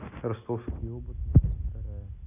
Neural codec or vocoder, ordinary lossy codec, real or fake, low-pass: none; none; real; 3.6 kHz